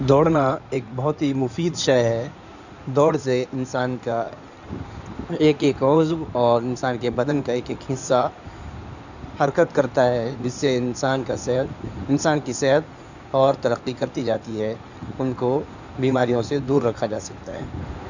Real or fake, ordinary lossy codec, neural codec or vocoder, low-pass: fake; none; codec, 16 kHz in and 24 kHz out, 2.2 kbps, FireRedTTS-2 codec; 7.2 kHz